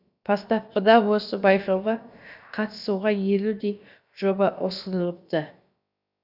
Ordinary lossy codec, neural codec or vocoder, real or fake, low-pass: none; codec, 16 kHz, about 1 kbps, DyCAST, with the encoder's durations; fake; 5.4 kHz